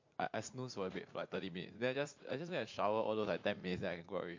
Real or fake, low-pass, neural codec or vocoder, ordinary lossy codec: fake; 7.2 kHz; vocoder, 44.1 kHz, 80 mel bands, Vocos; MP3, 48 kbps